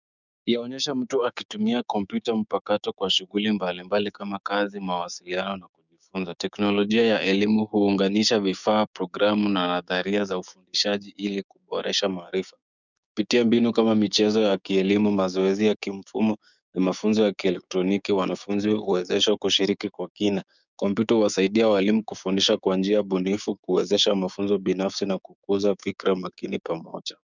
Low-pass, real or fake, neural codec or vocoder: 7.2 kHz; fake; codec, 16 kHz, 6 kbps, DAC